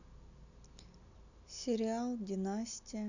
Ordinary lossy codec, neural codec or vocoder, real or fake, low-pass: none; none; real; 7.2 kHz